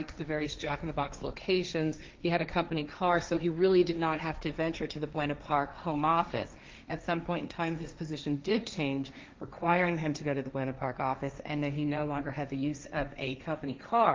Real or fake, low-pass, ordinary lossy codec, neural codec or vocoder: fake; 7.2 kHz; Opus, 24 kbps; codec, 16 kHz, 1.1 kbps, Voila-Tokenizer